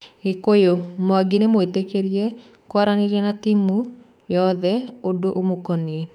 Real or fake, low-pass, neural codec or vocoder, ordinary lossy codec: fake; 19.8 kHz; autoencoder, 48 kHz, 32 numbers a frame, DAC-VAE, trained on Japanese speech; none